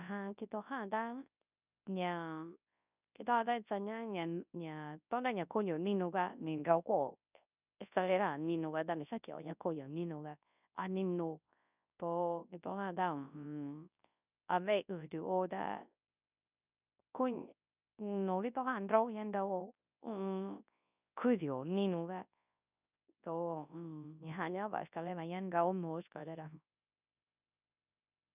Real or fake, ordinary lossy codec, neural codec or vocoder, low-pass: fake; none; codec, 24 kHz, 0.9 kbps, WavTokenizer, large speech release; 3.6 kHz